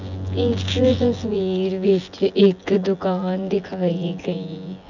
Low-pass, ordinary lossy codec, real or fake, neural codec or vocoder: 7.2 kHz; none; fake; vocoder, 24 kHz, 100 mel bands, Vocos